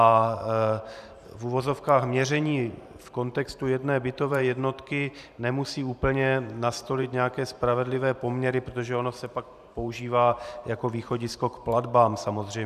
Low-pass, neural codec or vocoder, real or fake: 14.4 kHz; vocoder, 44.1 kHz, 128 mel bands every 512 samples, BigVGAN v2; fake